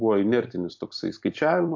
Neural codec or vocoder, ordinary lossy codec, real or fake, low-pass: vocoder, 44.1 kHz, 128 mel bands every 256 samples, BigVGAN v2; AAC, 48 kbps; fake; 7.2 kHz